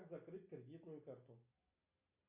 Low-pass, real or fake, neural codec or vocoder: 3.6 kHz; real; none